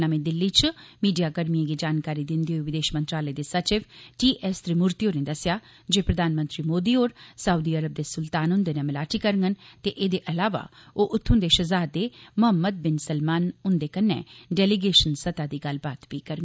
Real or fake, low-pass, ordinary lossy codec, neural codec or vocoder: real; none; none; none